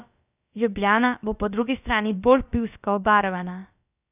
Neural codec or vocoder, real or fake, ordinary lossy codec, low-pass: codec, 16 kHz, about 1 kbps, DyCAST, with the encoder's durations; fake; none; 3.6 kHz